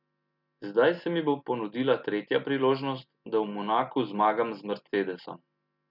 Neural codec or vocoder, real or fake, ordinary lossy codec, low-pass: none; real; none; 5.4 kHz